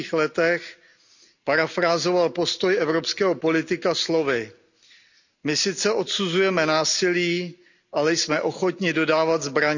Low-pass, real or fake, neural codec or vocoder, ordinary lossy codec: 7.2 kHz; real; none; none